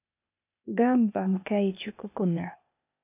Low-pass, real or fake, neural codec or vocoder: 3.6 kHz; fake; codec, 16 kHz, 0.8 kbps, ZipCodec